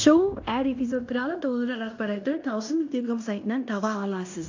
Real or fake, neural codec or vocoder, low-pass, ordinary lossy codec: fake; codec, 16 kHz in and 24 kHz out, 0.9 kbps, LongCat-Audio-Codec, fine tuned four codebook decoder; 7.2 kHz; AAC, 48 kbps